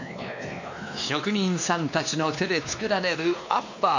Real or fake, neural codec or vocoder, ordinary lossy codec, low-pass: fake; codec, 16 kHz, 2 kbps, X-Codec, WavLM features, trained on Multilingual LibriSpeech; none; 7.2 kHz